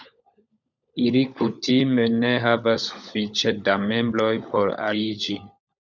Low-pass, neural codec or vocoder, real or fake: 7.2 kHz; codec, 16 kHz, 16 kbps, FunCodec, trained on LibriTTS, 50 frames a second; fake